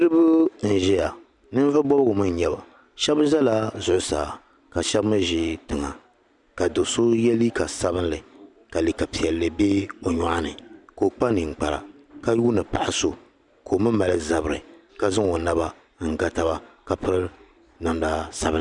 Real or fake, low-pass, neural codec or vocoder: real; 10.8 kHz; none